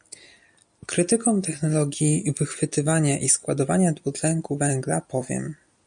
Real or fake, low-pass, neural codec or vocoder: real; 9.9 kHz; none